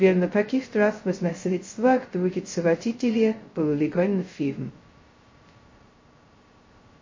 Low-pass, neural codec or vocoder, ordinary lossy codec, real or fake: 7.2 kHz; codec, 16 kHz, 0.2 kbps, FocalCodec; MP3, 32 kbps; fake